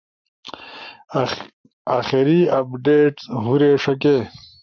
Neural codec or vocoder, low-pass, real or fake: codec, 44.1 kHz, 7.8 kbps, Pupu-Codec; 7.2 kHz; fake